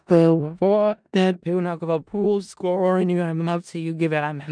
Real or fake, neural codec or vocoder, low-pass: fake; codec, 16 kHz in and 24 kHz out, 0.4 kbps, LongCat-Audio-Codec, four codebook decoder; 9.9 kHz